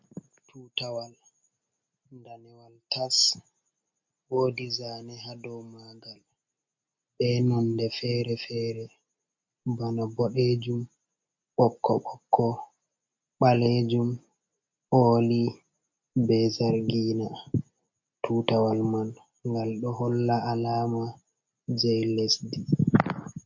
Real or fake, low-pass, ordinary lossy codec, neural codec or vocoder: real; 7.2 kHz; MP3, 48 kbps; none